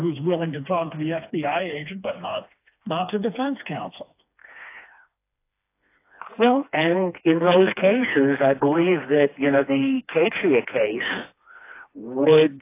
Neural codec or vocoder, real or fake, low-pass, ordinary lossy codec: codec, 16 kHz, 2 kbps, FreqCodec, smaller model; fake; 3.6 kHz; AAC, 24 kbps